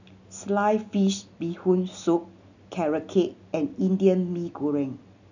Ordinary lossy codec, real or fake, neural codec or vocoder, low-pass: none; real; none; 7.2 kHz